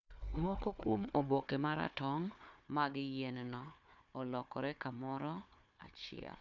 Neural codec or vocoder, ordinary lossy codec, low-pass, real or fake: codec, 16 kHz, 4 kbps, FunCodec, trained on Chinese and English, 50 frames a second; MP3, 64 kbps; 7.2 kHz; fake